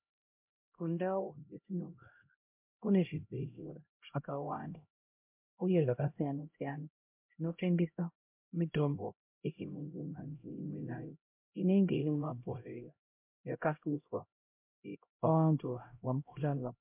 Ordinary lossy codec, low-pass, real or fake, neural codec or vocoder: MP3, 32 kbps; 3.6 kHz; fake; codec, 16 kHz, 0.5 kbps, X-Codec, HuBERT features, trained on LibriSpeech